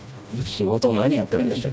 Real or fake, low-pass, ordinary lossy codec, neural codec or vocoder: fake; none; none; codec, 16 kHz, 1 kbps, FreqCodec, smaller model